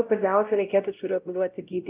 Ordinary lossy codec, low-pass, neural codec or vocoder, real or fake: Opus, 24 kbps; 3.6 kHz; codec, 16 kHz, 0.5 kbps, X-Codec, WavLM features, trained on Multilingual LibriSpeech; fake